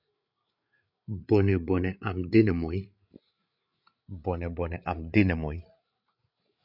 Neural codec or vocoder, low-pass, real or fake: codec, 16 kHz, 8 kbps, FreqCodec, larger model; 5.4 kHz; fake